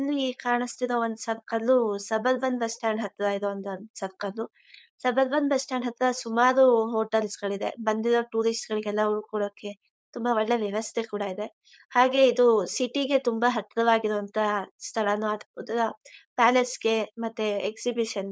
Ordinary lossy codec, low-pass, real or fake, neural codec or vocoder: none; none; fake; codec, 16 kHz, 4.8 kbps, FACodec